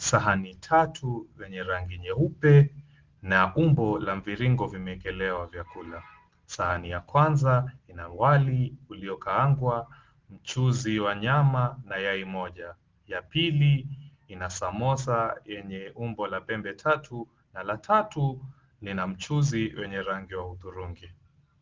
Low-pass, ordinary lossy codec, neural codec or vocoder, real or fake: 7.2 kHz; Opus, 16 kbps; none; real